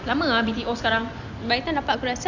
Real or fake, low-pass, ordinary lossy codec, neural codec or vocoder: real; 7.2 kHz; none; none